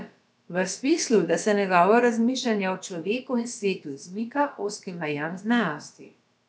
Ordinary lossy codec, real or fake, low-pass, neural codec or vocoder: none; fake; none; codec, 16 kHz, about 1 kbps, DyCAST, with the encoder's durations